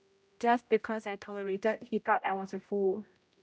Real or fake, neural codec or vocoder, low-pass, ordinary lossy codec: fake; codec, 16 kHz, 0.5 kbps, X-Codec, HuBERT features, trained on general audio; none; none